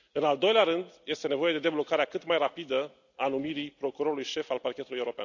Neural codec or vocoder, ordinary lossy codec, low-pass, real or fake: none; none; 7.2 kHz; real